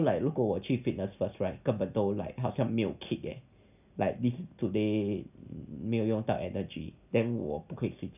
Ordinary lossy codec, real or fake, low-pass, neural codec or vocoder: none; real; 3.6 kHz; none